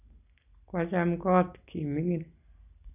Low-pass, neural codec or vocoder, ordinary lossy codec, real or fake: 3.6 kHz; none; none; real